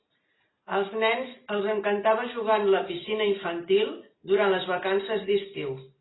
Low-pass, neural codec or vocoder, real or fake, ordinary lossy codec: 7.2 kHz; none; real; AAC, 16 kbps